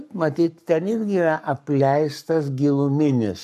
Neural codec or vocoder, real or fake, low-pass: codec, 44.1 kHz, 7.8 kbps, Pupu-Codec; fake; 14.4 kHz